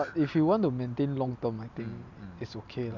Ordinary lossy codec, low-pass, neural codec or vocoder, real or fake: none; 7.2 kHz; none; real